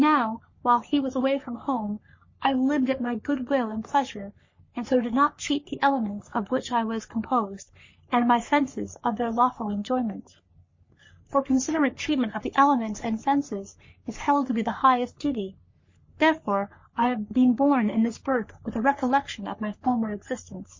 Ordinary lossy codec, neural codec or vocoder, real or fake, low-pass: MP3, 32 kbps; codec, 44.1 kHz, 3.4 kbps, Pupu-Codec; fake; 7.2 kHz